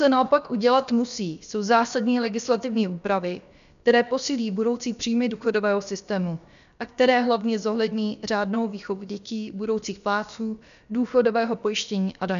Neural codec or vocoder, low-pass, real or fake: codec, 16 kHz, about 1 kbps, DyCAST, with the encoder's durations; 7.2 kHz; fake